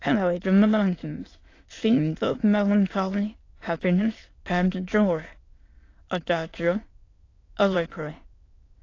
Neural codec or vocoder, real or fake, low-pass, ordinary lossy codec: autoencoder, 22.05 kHz, a latent of 192 numbers a frame, VITS, trained on many speakers; fake; 7.2 kHz; AAC, 32 kbps